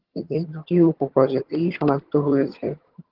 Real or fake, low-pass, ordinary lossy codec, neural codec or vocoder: fake; 5.4 kHz; Opus, 16 kbps; vocoder, 22.05 kHz, 80 mel bands, HiFi-GAN